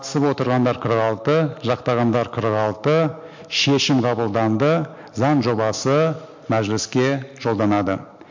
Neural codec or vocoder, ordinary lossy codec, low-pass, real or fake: none; MP3, 48 kbps; 7.2 kHz; real